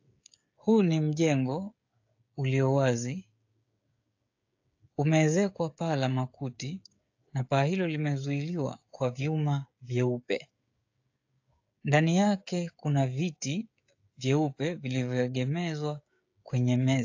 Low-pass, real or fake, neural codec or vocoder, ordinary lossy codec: 7.2 kHz; fake; codec, 16 kHz, 16 kbps, FreqCodec, smaller model; AAC, 48 kbps